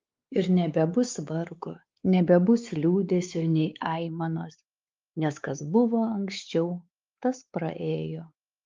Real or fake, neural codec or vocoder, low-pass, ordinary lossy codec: fake; codec, 16 kHz, 4 kbps, X-Codec, WavLM features, trained on Multilingual LibriSpeech; 7.2 kHz; Opus, 32 kbps